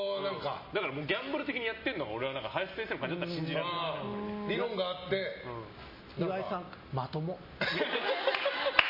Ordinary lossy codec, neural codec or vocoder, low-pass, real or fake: MP3, 24 kbps; none; 5.4 kHz; real